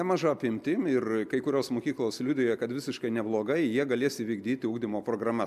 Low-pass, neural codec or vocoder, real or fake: 14.4 kHz; none; real